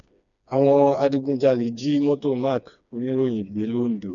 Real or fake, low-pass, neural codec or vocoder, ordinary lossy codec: fake; 7.2 kHz; codec, 16 kHz, 2 kbps, FreqCodec, smaller model; none